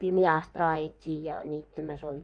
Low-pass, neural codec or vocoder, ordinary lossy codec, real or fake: 9.9 kHz; codec, 16 kHz in and 24 kHz out, 1.1 kbps, FireRedTTS-2 codec; none; fake